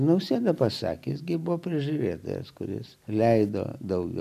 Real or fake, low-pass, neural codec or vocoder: real; 14.4 kHz; none